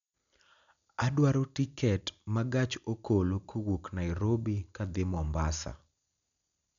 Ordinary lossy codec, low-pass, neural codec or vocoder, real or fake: none; 7.2 kHz; none; real